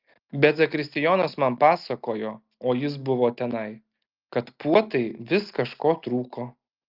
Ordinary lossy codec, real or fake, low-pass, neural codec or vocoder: Opus, 32 kbps; real; 5.4 kHz; none